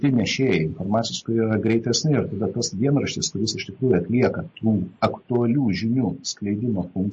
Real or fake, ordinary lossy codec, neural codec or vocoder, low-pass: real; MP3, 32 kbps; none; 9.9 kHz